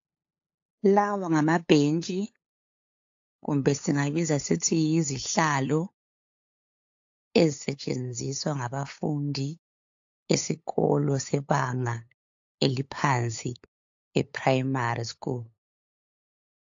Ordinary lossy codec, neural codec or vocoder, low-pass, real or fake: AAC, 48 kbps; codec, 16 kHz, 8 kbps, FunCodec, trained on LibriTTS, 25 frames a second; 7.2 kHz; fake